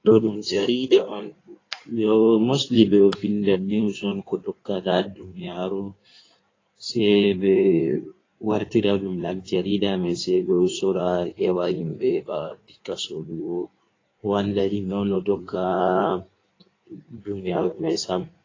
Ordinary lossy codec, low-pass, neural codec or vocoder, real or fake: AAC, 32 kbps; 7.2 kHz; codec, 16 kHz in and 24 kHz out, 1.1 kbps, FireRedTTS-2 codec; fake